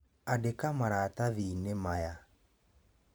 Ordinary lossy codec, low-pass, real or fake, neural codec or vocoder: none; none; fake; vocoder, 44.1 kHz, 128 mel bands every 512 samples, BigVGAN v2